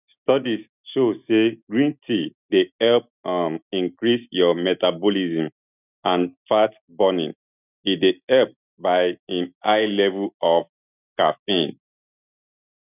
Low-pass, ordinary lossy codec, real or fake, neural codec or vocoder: 3.6 kHz; none; real; none